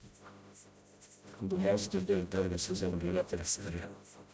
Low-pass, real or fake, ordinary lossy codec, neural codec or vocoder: none; fake; none; codec, 16 kHz, 0.5 kbps, FreqCodec, smaller model